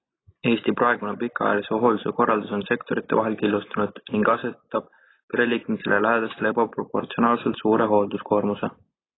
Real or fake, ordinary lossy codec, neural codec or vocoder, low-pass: real; AAC, 16 kbps; none; 7.2 kHz